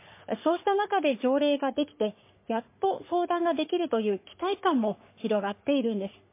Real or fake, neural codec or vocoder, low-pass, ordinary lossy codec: fake; codec, 44.1 kHz, 3.4 kbps, Pupu-Codec; 3.6 kHz; MP3, 24 kbps